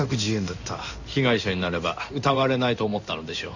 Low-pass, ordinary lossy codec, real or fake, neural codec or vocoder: 7.2 kHz; none; fake; codec, 16 kHz in and 24 kHz out, 1 kbps, XY-Tokenizer